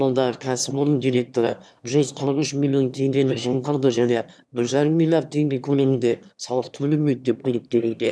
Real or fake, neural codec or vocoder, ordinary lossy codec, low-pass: fake; autoencoder, 22.05 kHz, a latent of 192 numbers a frame, VITS, trained on one speaker; none; none